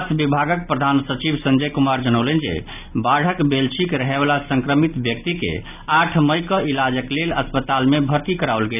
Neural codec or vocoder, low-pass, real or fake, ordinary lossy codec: none; 3.6 kHz; real; none